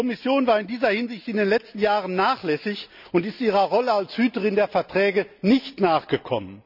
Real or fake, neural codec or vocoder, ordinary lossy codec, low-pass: real; none; none; 5.4 kHz